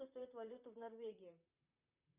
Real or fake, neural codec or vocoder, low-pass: fake; vocoder, 44.1 kHz, 128 mel bands, Pupu-Vocoder; 3.6 kHz